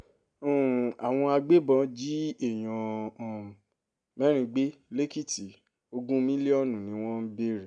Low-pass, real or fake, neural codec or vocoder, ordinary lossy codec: 9.9 kHz; real; none; none